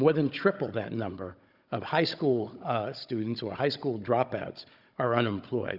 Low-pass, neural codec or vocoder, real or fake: 5.4 kHz; codec, 16 kHz, 16 kbps, FunCodec, trained on Chinese and English, 50 frames a second; fake